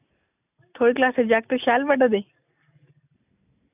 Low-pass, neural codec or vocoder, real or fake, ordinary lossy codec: 3.6 kHz; none; real; none